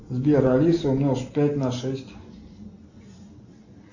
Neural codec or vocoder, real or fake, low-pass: none; real; 7.2 kHz